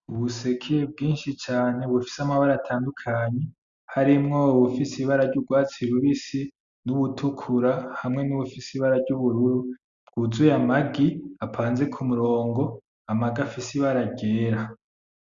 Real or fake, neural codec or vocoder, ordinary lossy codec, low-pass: real; none; Opus, 64 kbps; 7.2 kHz